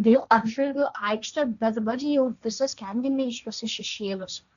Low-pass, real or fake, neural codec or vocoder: 7.2 kHz; fake; codec, 16 kHz, 1.1 kbps, Voila-Tokenizer